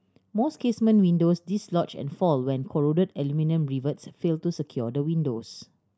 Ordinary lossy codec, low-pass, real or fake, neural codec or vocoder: none; none; real; none